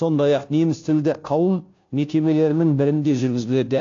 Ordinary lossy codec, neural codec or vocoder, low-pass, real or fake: AAC, 48 kbps; codec, 16 kHz, 0.5 kbps, FunCodec, trained on Chinese and English, 25 frames a second; 7.2 kHz; fake